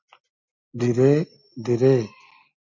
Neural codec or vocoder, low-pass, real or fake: none; 7.2 kHz; real